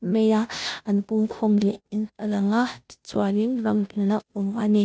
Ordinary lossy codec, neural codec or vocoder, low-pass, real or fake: none; codec, 16 kHz, 0.5 kbps, FunCodec, trained on Chinese and English, 25 frames a second; none; fake